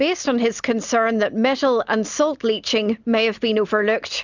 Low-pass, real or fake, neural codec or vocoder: 7.2 kHz; real; none